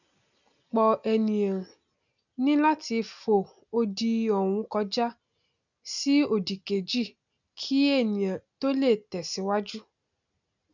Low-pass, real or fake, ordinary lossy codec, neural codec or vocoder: 7.2 kHz; real; none; none